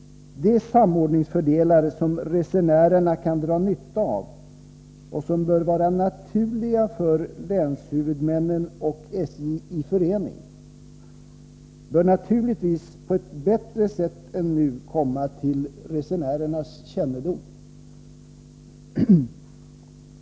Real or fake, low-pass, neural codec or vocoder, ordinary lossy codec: real; none; none; none